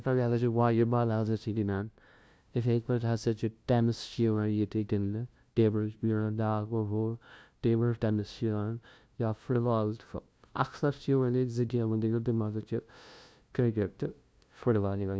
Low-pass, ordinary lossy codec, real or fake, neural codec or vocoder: none; none; fake; codec, 16 kHz, 0.5 kbps, FunCodec, trained on LibriTTS, 25 frames a second